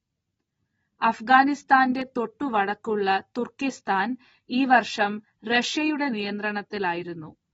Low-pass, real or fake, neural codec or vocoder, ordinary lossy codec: 9.9 kHz; real; none; AAC, 24 kbps